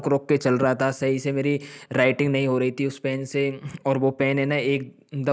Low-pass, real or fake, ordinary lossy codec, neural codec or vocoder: none; real; none; none